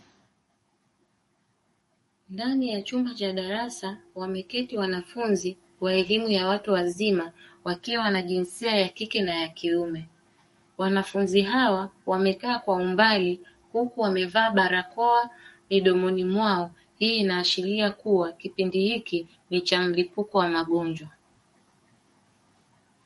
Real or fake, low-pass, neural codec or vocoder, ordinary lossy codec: fake; 19.8 kHz; codec, 44.1 kHz, 7.8 kbps, DAC; MP3, 48 kbps